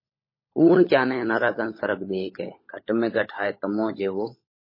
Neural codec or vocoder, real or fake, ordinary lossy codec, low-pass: codec, 16 kHz, 16 kbps, FunCodec, trained on LibriTTS, 50 frames a second; fake; MP3, 24 kbps; 5.4 kHz